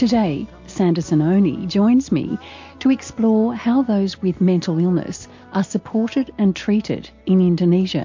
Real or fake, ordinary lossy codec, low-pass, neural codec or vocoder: real; MP3, 48 kbps; 7.2 kHz; none